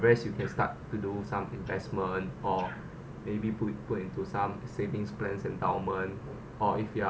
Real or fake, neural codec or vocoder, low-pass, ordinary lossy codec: real; none; none; none